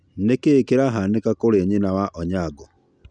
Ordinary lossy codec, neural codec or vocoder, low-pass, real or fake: none; none; 9.9 kHz; real